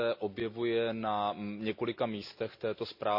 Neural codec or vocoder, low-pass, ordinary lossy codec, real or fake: none; 5.4 kHz; AAC, 48 kbps; real